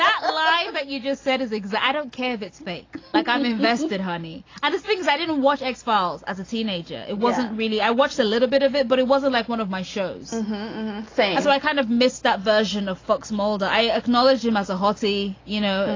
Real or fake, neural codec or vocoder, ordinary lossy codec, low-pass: real; none; AAC, 32 kbps; 7.2 kHz